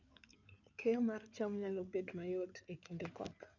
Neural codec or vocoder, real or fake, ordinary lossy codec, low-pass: codec, 16 kHz in and 24 kHz out, 2.2 kbps, FireRedTTS-2 codec; fake; none; 7.2 kHz